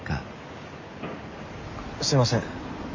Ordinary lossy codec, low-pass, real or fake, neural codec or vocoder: MP3, 48 kbps; 7.2 kHz; real; none